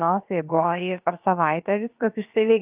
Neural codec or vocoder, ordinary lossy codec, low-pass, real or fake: codec, 16 kHz, about 1 kbps, DyCAST, with the encoder's durations; Opus, 24 kbps; 3.6 kHz; fake